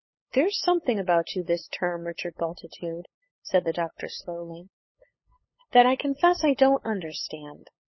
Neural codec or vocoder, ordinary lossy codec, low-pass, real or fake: codec, 16 kHz, 8 kbps, FunCodec, trained on LibriTTS, 25 frames a second; MP3, 24 kbps; 7.2 kHz; fake